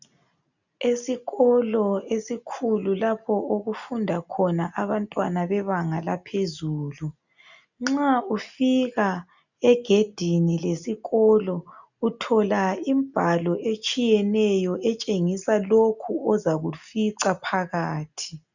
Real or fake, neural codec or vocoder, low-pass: real; none; 7.2 kHz